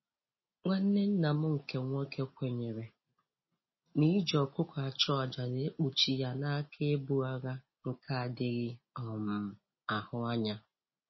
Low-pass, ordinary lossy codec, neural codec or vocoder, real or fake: 7.2 kHz; MP3, 24 kbps; none; real